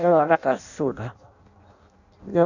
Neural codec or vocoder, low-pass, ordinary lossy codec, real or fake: codec, 16 kHz in and 24 kHz out, 0.6 kbps, FireRedTTS-2 codec; 7.2 kHz; none; fake